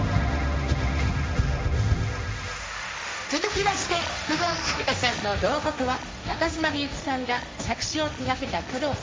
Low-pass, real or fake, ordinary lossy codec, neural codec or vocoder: none; fake; none; codec, 16 kHz, 1.1 kbps, Voila-Tokenizer